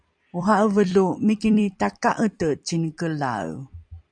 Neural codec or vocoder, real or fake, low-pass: vocoder, 44.1 kHz, 128 mel bands every 256 samples, BigVGAN v2; fake; 9.9 kHz